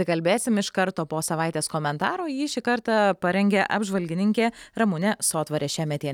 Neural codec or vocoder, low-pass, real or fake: none; 19.8 kHz; real